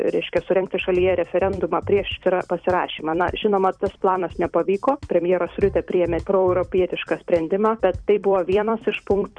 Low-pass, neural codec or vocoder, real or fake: 9.9 kHz; vocoder, 44.1 kHz, 128 mel bands every 256 samples, BigVGAN v2; fake